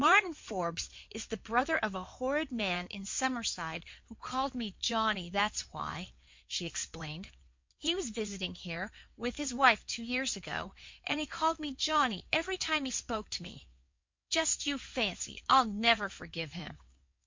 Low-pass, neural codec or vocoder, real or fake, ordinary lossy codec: 7.2 kHz; codec, 16 kHz in and 24 kHz out, 2.2 kbps, FireRedTTS-2 codec; fake; MP3, 48 kbps